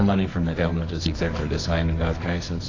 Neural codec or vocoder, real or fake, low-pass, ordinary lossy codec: codec, 24 kHz, 0.9 kbps, WavTokenizer, medium music audio release; fake; 7.2 kHz; AAC, 32 kbps